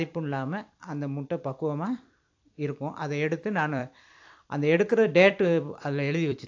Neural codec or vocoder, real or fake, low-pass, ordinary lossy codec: vocoder, 44.1 kHz, 128 mel bands every 256 samples, BigVGAN v2; fake; 7.2 kHz; AAC, 48 kbps